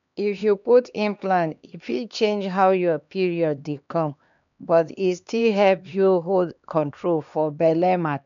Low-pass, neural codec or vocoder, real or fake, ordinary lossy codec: 7.2 kHz; codec, 16 kHz, 2 kbps, X-Codec, HuBERT features, trained on LibriSpeech; fake; none